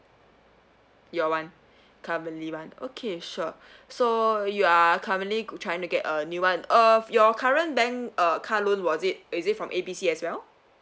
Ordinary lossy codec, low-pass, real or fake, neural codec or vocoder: none; none; real; none